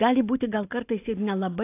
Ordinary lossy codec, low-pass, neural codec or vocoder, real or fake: AAC, 24 kbps; 3.6 kHz; none; real